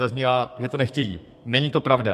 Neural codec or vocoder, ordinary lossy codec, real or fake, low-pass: codec, 32 kHz, 1.9 kbps, SNAC; MP3, 96 kbps; fake; 14.4 kHz